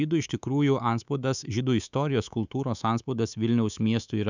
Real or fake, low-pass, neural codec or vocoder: fake; 7.2 kHz; codec, 24 kHz, 3.1 kbps, DualCodec